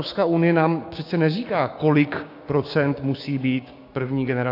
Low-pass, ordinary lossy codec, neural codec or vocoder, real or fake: 5.4 kHz; AAC, 32 kbps; codec, 16 kHz, 6 kbps, DAC; fake